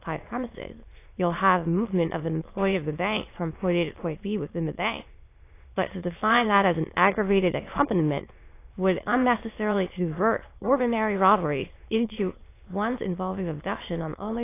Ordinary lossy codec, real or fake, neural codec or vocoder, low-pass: AAC, 24 kbps; fake; autoencoder, 22.05 kHz, a latent of 192 numbers a frame, VITS, trained on many speakers; 3.6 kHz